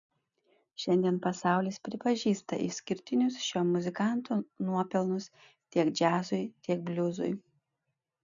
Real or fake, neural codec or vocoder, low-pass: real; none; 7.2 kHz